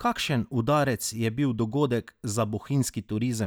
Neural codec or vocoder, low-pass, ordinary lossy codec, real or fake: none; none; none; real